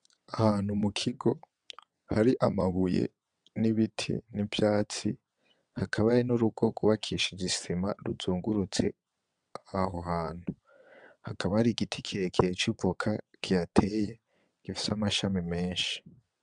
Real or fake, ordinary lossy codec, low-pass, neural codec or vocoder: fake; MP3, 96 kbps; 9.9 kHz; vocoder, 22.05 kHz, 80 mel bands, WaveNeXt